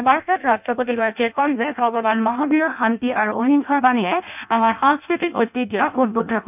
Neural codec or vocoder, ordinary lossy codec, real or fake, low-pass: codec, 16 kHz in and 24 kHz out, 0.6 kbps, FireRedTTS-2 codec; none; fake; 3.6 kHz